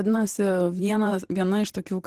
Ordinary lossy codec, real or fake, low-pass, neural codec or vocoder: Opus, 16 kbps; fake; 14.4 kHz; vocoder, 44.1 kHz, 128 mel bands every 512 samples, BigVGAN v2